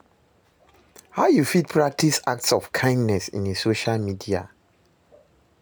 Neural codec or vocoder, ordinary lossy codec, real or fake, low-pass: none; none; real; none